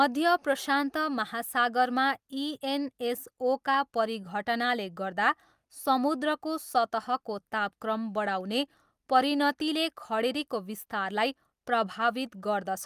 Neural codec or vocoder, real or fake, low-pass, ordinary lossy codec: none; real; 14.4 kHz; Opus, 32 kbps